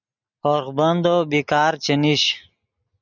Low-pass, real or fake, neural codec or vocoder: 7.2 kHz; real; none